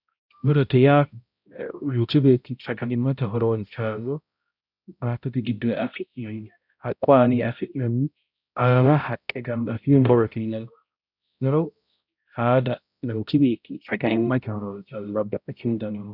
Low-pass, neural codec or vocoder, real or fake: 5.4 kHz; codec, 16 kHz, 0.5 kbps, X-Codec, HuBERT features, trained on balanced general audio; fake